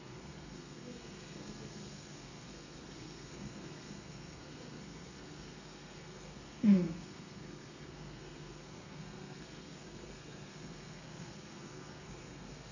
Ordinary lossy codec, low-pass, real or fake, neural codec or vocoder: none; 7.2 kHz; fake; codec, 32 kHz, 1.9 kbps, SNAC